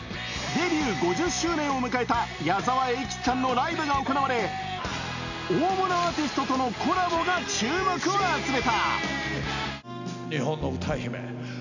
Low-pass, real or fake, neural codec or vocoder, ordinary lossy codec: 7.2 kHz; real; none; none